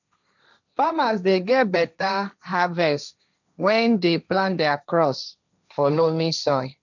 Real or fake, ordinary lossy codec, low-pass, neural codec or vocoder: fake; none; 7.2 kHz; codec, 16 kHz, 1.1 kbps, Voila-Tokenizer